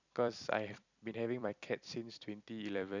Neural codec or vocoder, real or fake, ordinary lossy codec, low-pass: vocoder, 44.1 kHz, 128 mel bands every 256 samples, BigVGAN v2; fake; none; 7.2 kHz